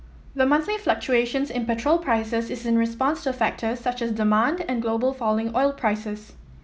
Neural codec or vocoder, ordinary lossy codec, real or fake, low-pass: none; none; real; none